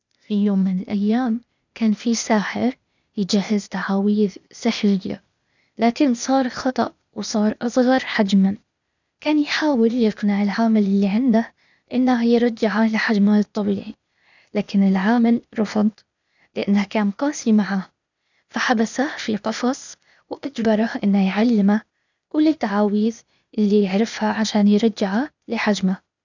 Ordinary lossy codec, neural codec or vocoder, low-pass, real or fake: none; codec, 16 kHz, 0.8 kbps, ZipCodec; 7.2 kHz; fake